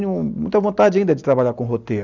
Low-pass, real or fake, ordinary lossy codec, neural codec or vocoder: 7.2 kHz; real; none; none